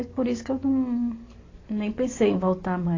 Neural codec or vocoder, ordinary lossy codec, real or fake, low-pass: none; AAC, 32 kbps; real; 7.2 kHz